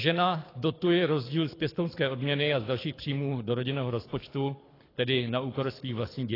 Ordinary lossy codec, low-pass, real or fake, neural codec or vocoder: AAC, 24 kbps; 5.4 kHz; fake; codec, 24 kHz, 6 kbps, HILCodec